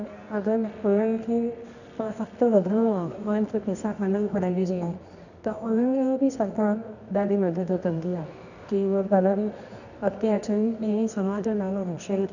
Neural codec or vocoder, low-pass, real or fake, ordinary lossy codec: codec, 24 kHz, 0.9 kbps, WavTokenizer, medium music audio release; 7.2 kHz; fake; none